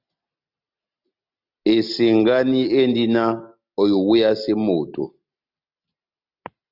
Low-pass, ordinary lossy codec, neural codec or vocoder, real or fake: 5.4 kHz; Opus, 64 kbps; none; real